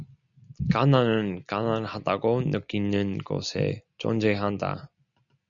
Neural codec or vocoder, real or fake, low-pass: none; real; 7.2 kHz